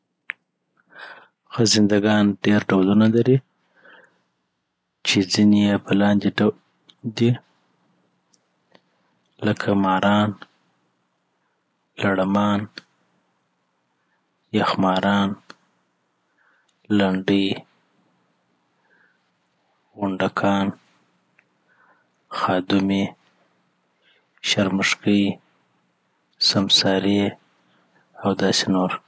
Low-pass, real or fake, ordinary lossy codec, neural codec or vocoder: none; real; none; none